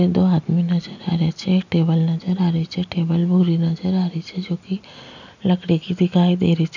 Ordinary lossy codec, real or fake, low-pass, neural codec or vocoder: none; real; 7.2 kHz; none